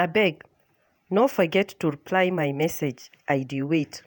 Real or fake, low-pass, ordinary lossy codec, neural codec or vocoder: fake; none; none; vocoder, 48 kHz, 128 mel bands, Vocos